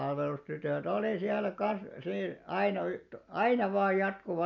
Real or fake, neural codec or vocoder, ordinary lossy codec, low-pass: real; none; none; 7.2 kHz